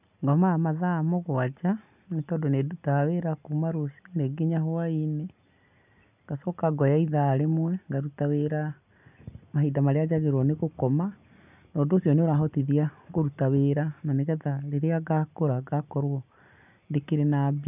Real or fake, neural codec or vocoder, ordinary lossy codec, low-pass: real; none; none; 3.6 kHz